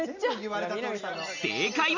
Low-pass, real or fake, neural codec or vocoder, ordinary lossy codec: 7.2 kHz; real; none; none